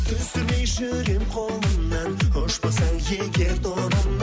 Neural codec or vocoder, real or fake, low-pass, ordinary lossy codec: none; real; none; none